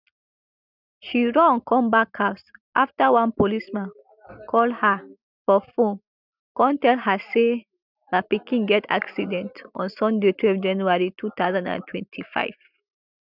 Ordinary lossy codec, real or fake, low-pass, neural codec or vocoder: none; real; 5.4 kHz; none